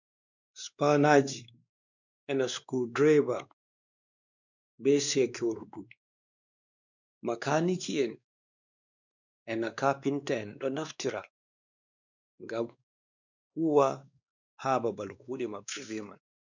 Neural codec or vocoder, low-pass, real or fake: codec, 16 kHz, 2 kbps, X-Codec, WavLM features, trained on Multilingual LibriSpeech; 7.2 kHz; fake